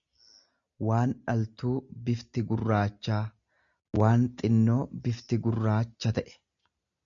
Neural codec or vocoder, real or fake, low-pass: none; real; 7.2 kHz